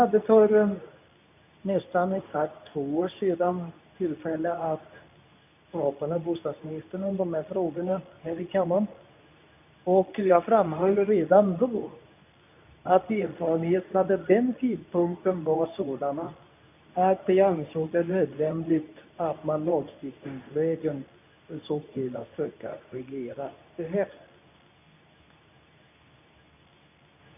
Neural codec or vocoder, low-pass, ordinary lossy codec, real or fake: codec, 24 kHz, 0.9 kbps, WavTokenizer, medium speech release version 2; 3.6 kHz; none; fake